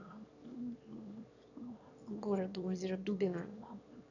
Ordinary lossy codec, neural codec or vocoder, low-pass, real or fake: none; autoencoder, 22.05 kHz, a latent of 192 numbers a frame, VITS, trained on one speaker; 7.2 kHz; fake